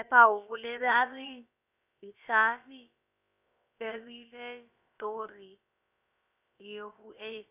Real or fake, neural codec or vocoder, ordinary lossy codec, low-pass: fake; codec, 16 kHz, about 1 kbps, DyCAST, with the encoder's durations; none; 3.6 kHz